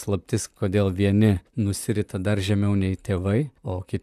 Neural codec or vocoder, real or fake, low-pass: vocoder, 44.1 kHz, 128 mel bands, Pupu-Vocoder; fake; 14.4 kHz